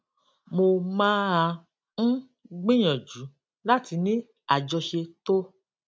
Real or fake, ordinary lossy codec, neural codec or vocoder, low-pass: real; none; none; none